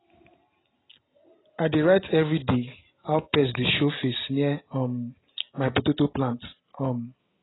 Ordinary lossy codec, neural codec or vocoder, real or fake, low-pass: AAC, 16 kbps; none; real; 7.2 kHz